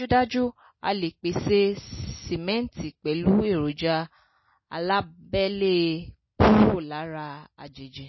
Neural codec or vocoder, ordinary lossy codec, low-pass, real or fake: none; MP3, 24 kbps; 7.2 kHz; real